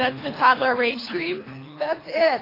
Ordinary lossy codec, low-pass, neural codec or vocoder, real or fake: AAC, 24 kbps; 5.4 kHz; codec, 24 kHz, 3 kbps, HILCodec; fake